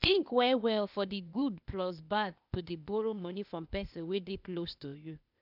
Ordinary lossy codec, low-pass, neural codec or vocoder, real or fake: none; 5.4 kHz; codec, 16 kHz, 0.8 kbps, ZipCodec; fake